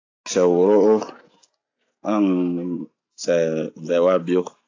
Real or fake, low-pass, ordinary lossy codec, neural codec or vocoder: real; 7.2 kHz; AAC, 48 kbps; none